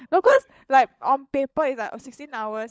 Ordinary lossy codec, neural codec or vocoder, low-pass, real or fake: none; codec, 16 kHz, 16 kbps, FunCodec, trained on LibriTTS, 50 frames a second; none; fake